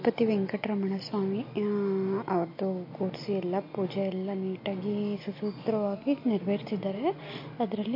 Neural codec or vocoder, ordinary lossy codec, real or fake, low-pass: none; MP3, 32 kbps; real; 5.4 kHz